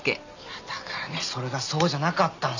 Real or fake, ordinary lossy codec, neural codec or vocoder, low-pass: real; none; none; 7.2 kHz